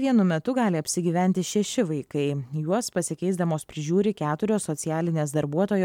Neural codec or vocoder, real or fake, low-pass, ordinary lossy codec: none; real; 19.8 kHz; MP3, 96 kbps